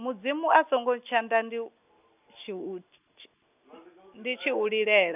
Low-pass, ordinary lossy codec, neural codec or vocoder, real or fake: 3.6 kHz; none; none; real